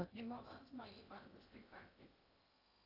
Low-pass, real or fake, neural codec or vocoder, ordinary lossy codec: 5.4 kHz; fake; codec, 16 kHz in and 24 kHz out, 0.8 kbps, FocalCodec, streaming, 65536 codes; AAC, 32 kbps